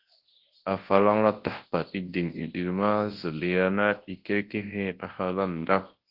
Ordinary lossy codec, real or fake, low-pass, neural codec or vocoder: Opus, 16 kbps; fake; 5.4 kHz; codec, 24 kHz, 0.9 kbps, WavTokenizer, large speech release